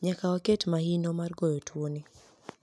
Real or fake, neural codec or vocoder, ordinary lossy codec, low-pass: real; none; none; none